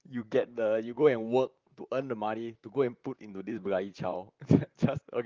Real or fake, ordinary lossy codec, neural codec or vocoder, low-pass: fake; Opus, 24 kbps; vocoder, 44.1 kHz, 128 mel bands, Pupu-Vocoder; 7.2 kHz